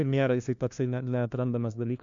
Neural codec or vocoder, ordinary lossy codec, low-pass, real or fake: codec, 16 kHz, 1 kbps, FunCodec, trained on LibriTTS, 50 frames a second; AAC, 64 kbps; 7.2 kHz; fake